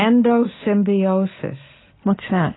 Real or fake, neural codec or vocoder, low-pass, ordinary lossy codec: real; none; 7.2 kHz; AAC, 16 kbps